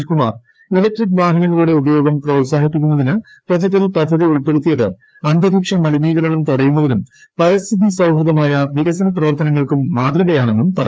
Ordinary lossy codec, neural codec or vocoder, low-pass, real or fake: none; codec, 16 kHz, 2 kbps, FreqCodec, larger model; none; fake